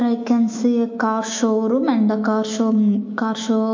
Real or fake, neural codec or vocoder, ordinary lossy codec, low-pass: fake; autoencoder, 48 kHz, 128 numbers a frame, DAC-VAE, trained on Japanese speech; MP3, 48 kbps; 7.2 kHz